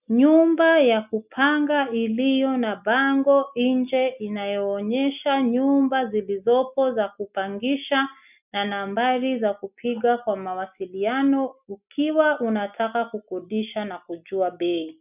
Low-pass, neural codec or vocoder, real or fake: 3.6 kHz; none; real